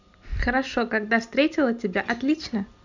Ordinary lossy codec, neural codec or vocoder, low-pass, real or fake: none; none; 7.2 kHz; real